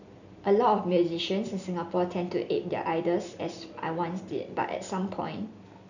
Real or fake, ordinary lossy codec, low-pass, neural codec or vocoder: real; none; 7.2 kHz; none